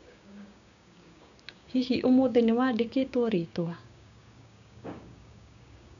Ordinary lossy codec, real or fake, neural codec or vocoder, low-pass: none; fake; codec, 16 kHz, 6 kbps, DAC; 7.2 kHz